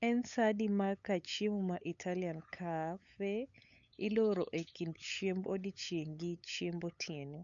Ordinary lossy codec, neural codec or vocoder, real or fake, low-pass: none; codec, 16 kHz, 8 kbps, FunCodec, trained on LibriTTS, 25 frames a second; fake; 7.2 kHz